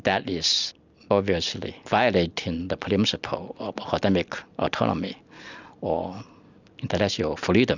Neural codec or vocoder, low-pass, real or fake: none; 7.2 kHz; real